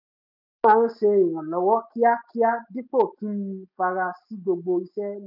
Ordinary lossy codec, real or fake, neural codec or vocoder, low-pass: none; real; none; 5.4 kHz